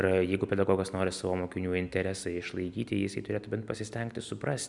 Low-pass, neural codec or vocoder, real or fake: 10.8 kHz; none; real